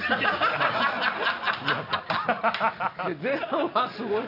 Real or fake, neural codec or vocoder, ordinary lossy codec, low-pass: real; none; none; 5.4 kHz